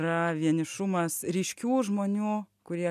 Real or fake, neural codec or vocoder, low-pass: real; none; 14.4 kHz